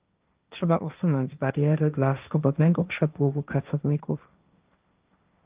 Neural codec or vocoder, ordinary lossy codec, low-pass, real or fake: codec, 16 kHz, 1.1 kbps, Voila-Tokenizer; Opus, 32 kbps; 3.6 kHz; fake